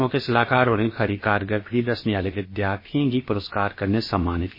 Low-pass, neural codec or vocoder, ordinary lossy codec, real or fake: 5.4 kHz; codec, 16 kHz, about 1 kbps, DyCAST, with the encoder's durations; MP3, 24 kbps; fake